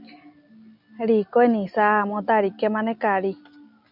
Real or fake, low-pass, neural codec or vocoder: real; 5.4 kHz; none